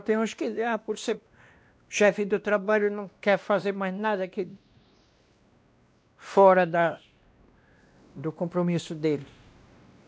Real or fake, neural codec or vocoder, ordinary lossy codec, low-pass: fake; codec, 16 kHz, 1 kbps, X-Codec, WavLM features, trained on Multilingual LibriSpeech; none; none